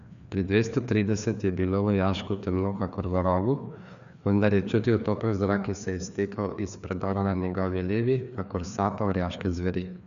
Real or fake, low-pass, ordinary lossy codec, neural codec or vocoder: fake; 7.2 kHz; none; codec, 16 kHz, 2 kbps, FreqCodec, larger model